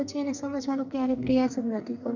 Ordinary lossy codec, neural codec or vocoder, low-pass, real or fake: none; codec, 44.1 kHz, 2.6 kbps, SNAC; 7.2 kHz; fake